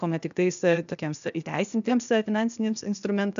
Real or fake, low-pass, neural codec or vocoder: fake; 7.2 kHz; codec, 16 kHz, 0.8 kbps, ZipCodec